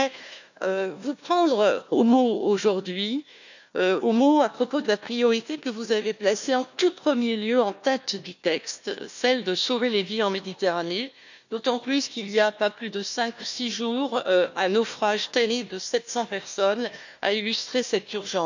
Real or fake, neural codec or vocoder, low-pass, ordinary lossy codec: fake; codec, 16 kHz, 1 kbps, FunCodec, trained on Chinese and English, 50 frames a second; 7.2 kHz; none